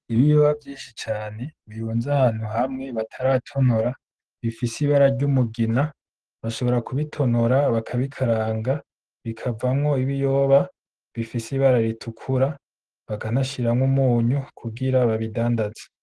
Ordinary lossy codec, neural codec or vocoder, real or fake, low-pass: Opus, 16 kbps; none; real; 10.8 kHz